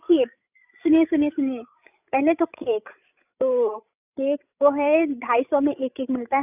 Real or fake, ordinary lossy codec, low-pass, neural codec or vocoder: fake; none; 3.6 kHz; codec, 16 kHz, 16 kbps, FreqCodec, larger model